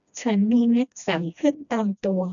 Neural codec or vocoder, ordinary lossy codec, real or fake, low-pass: codec, 16 kHz, 1 kbps, FreqCodec, smaller model; none; fake; 7.2 kHz